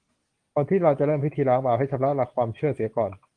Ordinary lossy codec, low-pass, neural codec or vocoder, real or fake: Opus, 24 kbps; 9.9 kHz; none; real